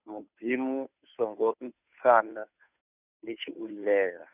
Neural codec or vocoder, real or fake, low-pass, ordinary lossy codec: codec, 16 kHz, 2 kbps, FunCodec, trained on Chinese and English, 25 frames a second; fake; 3.6 kHz; none